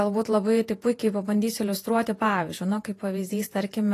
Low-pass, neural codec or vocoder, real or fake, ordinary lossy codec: 14.4 kHz; vocoder, 48 kHz, 128 mel bands, Vocos; fake; AAC, 48 kbps